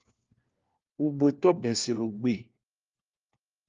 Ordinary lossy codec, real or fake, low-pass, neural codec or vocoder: Opus, 32 kbps; fake; 7.2 kHz; codec, 16 kHz, 1 kbps, FunCodec, trained on LibriTTS, 50 frames a second